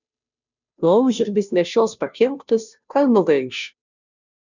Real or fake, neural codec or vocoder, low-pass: fake; codec, 16 kHz, 0.5 kbps, FunCodec, trained on Chinese and English, 25 frames a second; 7.2 kHz